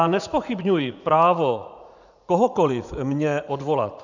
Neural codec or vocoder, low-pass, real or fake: vocoder, 44.1 kHz, 80 mel bands, Vocos; 7.2 kHz; fake